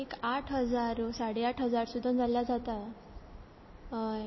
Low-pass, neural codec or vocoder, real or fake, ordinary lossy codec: 7.2 kHz; none; real; MP3, 24 kbps